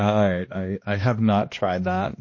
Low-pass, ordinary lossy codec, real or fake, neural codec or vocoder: 7.2 kHz; MP3, 32 kbps; fake; codec, 16 kHz, 1 kbps, X-Codec, HuBERT features, trained on balanced general audio